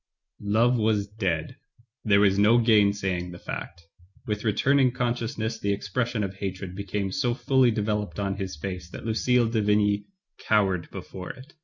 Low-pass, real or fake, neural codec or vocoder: 7.2 kHz; real; none